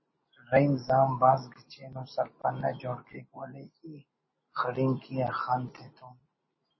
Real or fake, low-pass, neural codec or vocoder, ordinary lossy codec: real; 7.2 kHz; none; MP3, 24 kbps